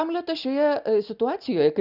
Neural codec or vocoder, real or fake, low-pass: none; real; 5.4 kHz